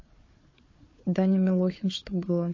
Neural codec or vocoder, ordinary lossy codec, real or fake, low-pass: codec, 16 kHz, 4 kbps, FunCodec, trained on Chinese and English, 50 frames a second; MP3, 32 kbps; fake; 7.2 kHz